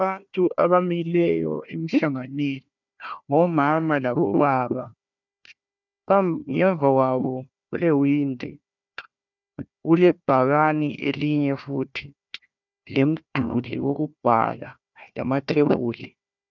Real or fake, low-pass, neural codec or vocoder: fake; 7.2 kHz; codec, 16 kHz, 1 kbps, FunCodec, trained on Chinese and English, 50 frames a second